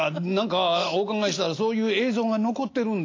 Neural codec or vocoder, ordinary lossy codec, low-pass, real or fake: none; AAC, 32 kbps; 7.2 kHz; real